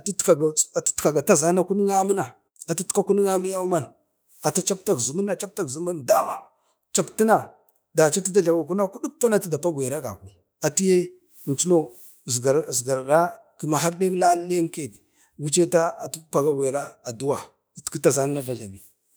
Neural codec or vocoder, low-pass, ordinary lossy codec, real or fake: autoencoder, 48 kHz, 32 numbers a frame, DAC-VAE, trained on Japanese speech; none; none; fake